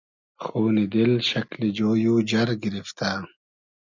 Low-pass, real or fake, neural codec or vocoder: 7.2 kHz; real; none